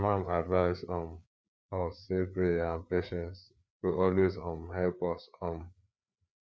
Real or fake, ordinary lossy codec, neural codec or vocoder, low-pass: fake; none; codec, 16 kHz, 4 kbps, FreqCodec, larger model; none